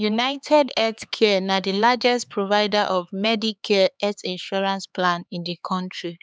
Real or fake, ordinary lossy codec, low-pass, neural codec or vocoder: fake; none; none; codec, 16 kHz, 4 kbps, X-Codec, HuBERT features, trained on LibriSpeech